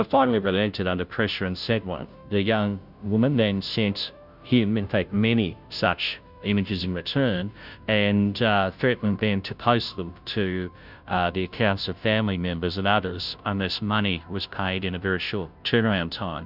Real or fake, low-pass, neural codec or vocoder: fake; 5.4 kHz; codec, 16 kHz, 0.5 kbps, FunCodec, trained on Chinese and English, 25 frames a second